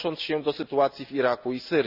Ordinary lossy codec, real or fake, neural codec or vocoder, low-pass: none; real; none; 5.4 kHz